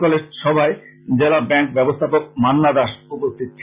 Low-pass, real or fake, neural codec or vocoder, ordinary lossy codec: 3.6 kHz; real; none; Opus, 64 kbps